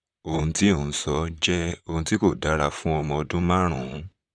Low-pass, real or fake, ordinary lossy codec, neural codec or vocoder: 9.9 kHz; fake; Opus, 64 kbps; vocoder, 44.1 kHz, 128 mel bands, Pupu-Vocoder